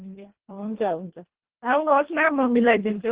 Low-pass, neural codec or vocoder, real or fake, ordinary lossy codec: 3.6 kHz; codec, 24 kHz, 1.5 kbps, HILCodec; fake; Opus, 16 kbps